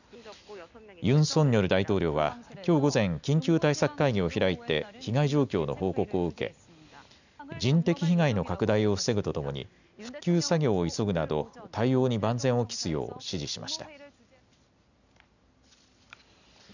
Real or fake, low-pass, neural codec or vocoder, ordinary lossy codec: real; 7.2 kHz; none; none